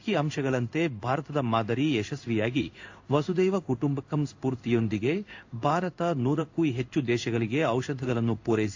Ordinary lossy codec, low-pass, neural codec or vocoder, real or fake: AAC, 48 kbps; 7.2 kHz; codec, 16 kHz in and 24 kHz out, 1 kbps, XY-Tokenizer; fake